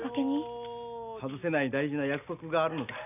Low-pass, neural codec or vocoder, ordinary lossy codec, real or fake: 3.6 kHz; none; none; real